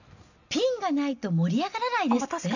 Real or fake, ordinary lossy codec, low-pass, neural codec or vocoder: real; none; 7.2 kHz; none